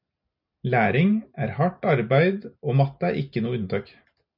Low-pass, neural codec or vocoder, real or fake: 5.4 kHz; none; real